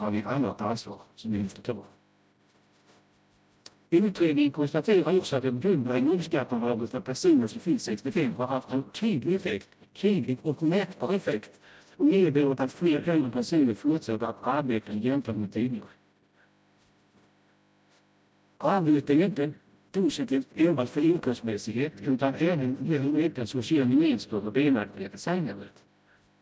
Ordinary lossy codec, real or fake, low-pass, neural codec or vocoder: none; fake; none; codec, 16 kHz, 0.5 kbps, FreqCodec, smaller model